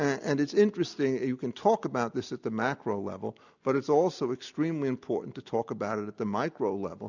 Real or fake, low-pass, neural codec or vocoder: real; 7.2 kHz; none